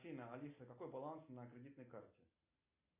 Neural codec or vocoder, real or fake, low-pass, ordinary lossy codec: none; real; 3.6 kHz; MP3, 24 kbps